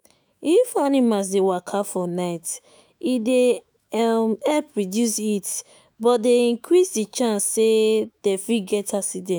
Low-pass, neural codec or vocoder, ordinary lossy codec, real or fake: none; autoencoder, 48 kHz, 128 numbers a frame, DAC-VAE, trained on Japanese speech; none; fake